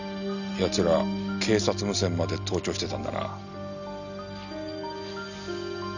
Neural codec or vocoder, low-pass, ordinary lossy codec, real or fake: none; 7.2 kHz; none; real